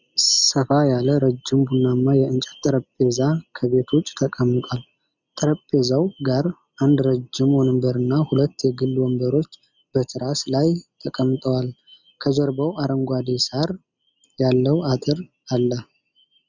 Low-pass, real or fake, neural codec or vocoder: 7.2 kHz; real; none